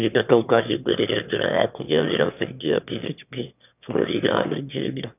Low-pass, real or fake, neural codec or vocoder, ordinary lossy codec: 3.6 kHz; fake; autoencoder, 22.05 kHz, a latent of 192 numbers a frame, VITS, trained on one speaker; none